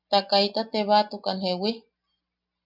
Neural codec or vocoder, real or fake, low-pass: none; real; 5.4 kHz